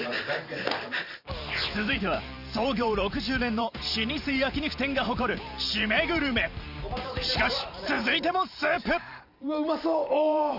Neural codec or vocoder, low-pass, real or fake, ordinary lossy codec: vocoder, 44.1 kHz, 128 mel bands every 512 samples, BigVGAN v2; 5.4 kHz; fake; none